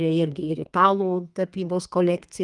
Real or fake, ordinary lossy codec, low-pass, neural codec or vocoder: fake; Opus, 32 kbps; 10.8 kHz; codec, 32 kHz, 1.9 kbps, SNAC